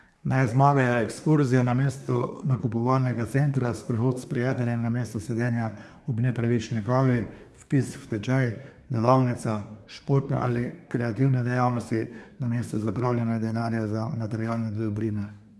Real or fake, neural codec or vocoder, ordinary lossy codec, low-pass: fake; codec, 24 kHz, 1 kbps, SNAC; none; none